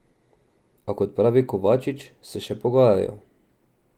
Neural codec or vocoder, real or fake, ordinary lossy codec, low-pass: none; real; Opus, 24 kbps; 19.8 kHz